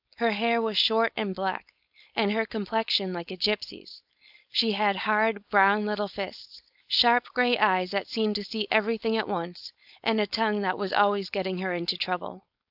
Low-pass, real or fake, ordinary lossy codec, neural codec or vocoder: 5.4 kHz; fake; AAC, 48 kbps; codec, 16 kHz, 4.8 kbps, FACodec